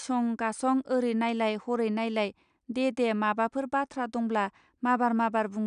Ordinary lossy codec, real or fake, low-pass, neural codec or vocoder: none; real; 9.9 kHz; none